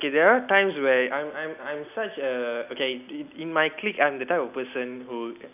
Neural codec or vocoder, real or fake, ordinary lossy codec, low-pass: none; real; none; 3.6 kHz